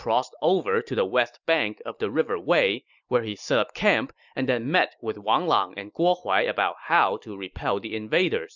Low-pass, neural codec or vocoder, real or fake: 7.2 kHz; none; real